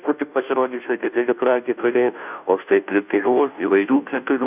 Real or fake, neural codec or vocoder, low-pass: fake; codec, 16 kHz, 0.5 kbps, FunCodec, trained on Chinese and English, 25 frames a second; 3.6 kHz